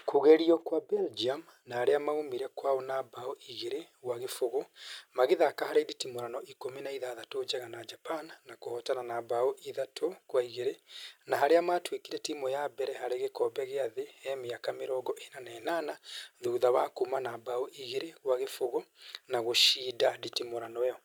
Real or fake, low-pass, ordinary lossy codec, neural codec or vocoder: real; none; none; none